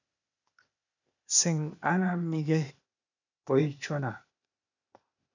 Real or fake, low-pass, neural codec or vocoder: fake; 7.2 kHz; codec, 16 kHz, 0.8 kbps, ZipCodec